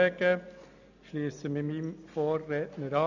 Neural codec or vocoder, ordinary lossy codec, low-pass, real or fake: none; none; 7.2 kHz; real